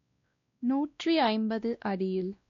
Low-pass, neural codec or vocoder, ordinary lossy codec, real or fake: 7.2 kHz; codec, 16 kHz, 1 kbps, X-Codec, WavLM features, trained on Multilingual LibriSpeech; AAC, 48 kbps; fake